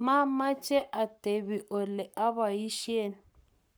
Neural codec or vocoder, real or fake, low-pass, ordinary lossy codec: codec, 44.1 kHz, 7.8 kbps, Pupu-Codec; fake; none; none